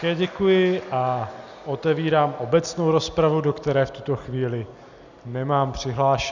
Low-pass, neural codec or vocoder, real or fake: 7.2 kHz; none; real